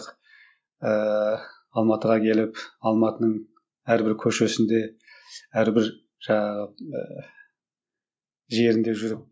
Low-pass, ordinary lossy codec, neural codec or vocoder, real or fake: none; none; none; real